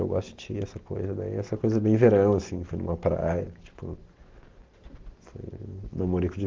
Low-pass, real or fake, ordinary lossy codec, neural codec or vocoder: 7.2 kHz; real; Opus, 16 kbps; none